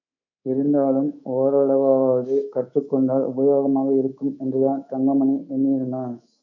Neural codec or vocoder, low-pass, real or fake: codec, 24 kHz, 3.1 kbps, DualCodec; 7.2 kHz; fake